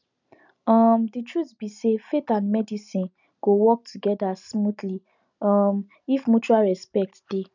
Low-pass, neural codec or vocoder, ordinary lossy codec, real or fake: 7.2 kHz; none; none; real